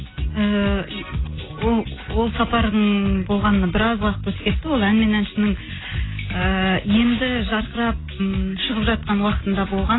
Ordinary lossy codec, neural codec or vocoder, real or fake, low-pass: AAC, 16 kbps; none; real; 7.2 kHz